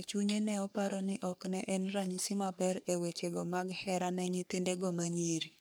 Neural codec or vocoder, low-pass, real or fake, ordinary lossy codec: codec, 44.1 kHz, 3.4 kbps, Pupu-Codec; none; fake; none